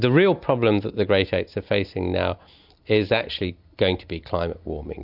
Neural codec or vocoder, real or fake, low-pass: none; real; 5.4 kHz